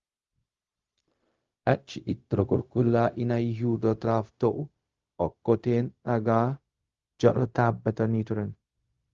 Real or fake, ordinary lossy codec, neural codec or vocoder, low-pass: fake; Opus, 32 kbps; codec, 16 kHz, 0.4 kbps, LongCat-Audio-Codec; 7.2 kHz